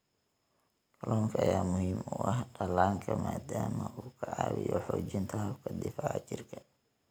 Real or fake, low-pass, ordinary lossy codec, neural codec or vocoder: real; none; none; none